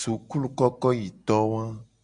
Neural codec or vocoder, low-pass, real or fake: none; 9.9 kHz; real